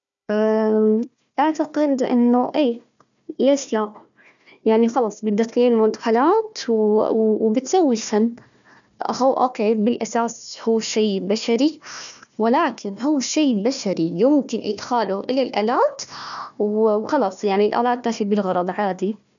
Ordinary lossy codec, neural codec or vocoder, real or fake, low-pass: none; codec, 16 kHz, 1 kbps, FunCodec, trained on Chinese and English, 50 frames a second; fake; 7.2 kHz